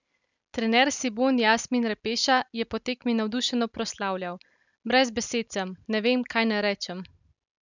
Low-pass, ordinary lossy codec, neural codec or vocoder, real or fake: 7.2 kHz; none; none; real